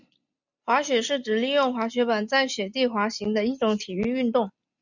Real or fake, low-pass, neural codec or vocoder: real; 7.2 kHz; none